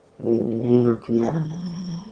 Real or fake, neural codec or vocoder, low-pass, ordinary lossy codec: fake; autoencoder, 22.05 kHz, a latent of 192 numbers a frame, VITS, trained on one speaker; 9.9 kHz; Opus, 16 kbps